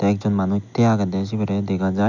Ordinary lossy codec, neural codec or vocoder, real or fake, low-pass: none; none; real; 7.2 kHz